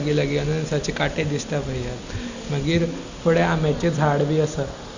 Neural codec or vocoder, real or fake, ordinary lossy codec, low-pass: none; real; Opus, 64 kbps; 7.2 kHz